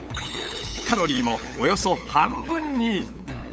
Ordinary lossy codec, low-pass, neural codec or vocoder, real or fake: none; none; codec, 16 kHz, 8 kbps, FunCodec, trained on LibriTTS, 25 frames a second; fake